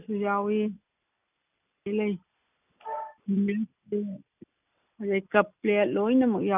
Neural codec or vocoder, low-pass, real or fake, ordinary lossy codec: none; 3.6 kHz; real; none